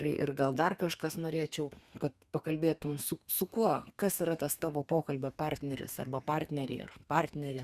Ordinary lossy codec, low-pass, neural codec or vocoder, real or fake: Opus, 64 kbps; 14.4 kHz; codec, 32 kHz, 1.9 kbps, SNAC; fake